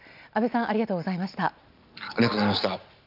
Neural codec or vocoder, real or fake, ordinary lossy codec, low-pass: none; real; none; 5.4 kHz